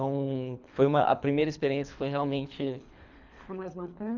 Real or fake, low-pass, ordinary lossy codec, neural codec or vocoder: fake; 7.2 kHz; none; codec, 24 kHz, 3 kbps, HILCodec